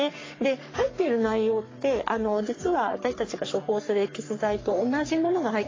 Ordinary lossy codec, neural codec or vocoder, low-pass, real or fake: AAC, 32 kbps; codec, 44.1 kHz, 3.4 kbps, Pupu-Codec; 7.2 kHz; fake